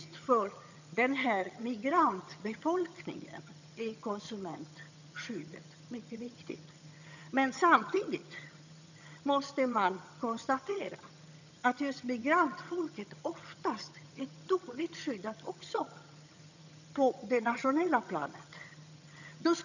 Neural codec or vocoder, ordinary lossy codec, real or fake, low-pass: vocoder, 22.05 kHz, 80 mel bands, HiFi-GAN; none; fake; 7.2 kHz